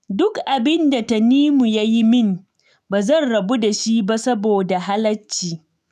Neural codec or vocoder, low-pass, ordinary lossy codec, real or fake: autoencoder, 48 kHz, 128 numbers a frame, DAC-VAE, trained on Japanese speech; 14.4 kHz; none; fake